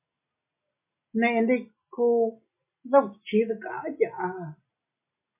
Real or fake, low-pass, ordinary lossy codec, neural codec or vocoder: real; 3.6 kHz; MP3, 32 kbps; none